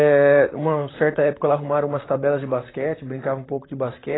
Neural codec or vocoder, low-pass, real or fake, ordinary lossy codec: vocoder, 44.1 kHz, 128 mel bands, Pupu-Vocoder; 7.2 kHz; fake; AAC, 16 kbps